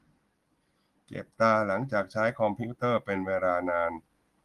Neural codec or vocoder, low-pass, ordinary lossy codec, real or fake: none; 14.4 kHz; Opus, 24 kbps; real